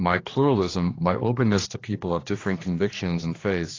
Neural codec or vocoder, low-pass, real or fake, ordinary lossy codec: codec, 16 kHz, 2 kbps, X-Codec, HuBERT features, trained on general audio; 7.2 kHz; fake; AAC, 32 kbps